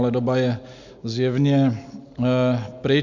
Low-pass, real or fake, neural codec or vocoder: 7.2 kHz; real; none